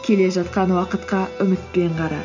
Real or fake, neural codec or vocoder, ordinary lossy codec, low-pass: real; none; none; 7.2 kHz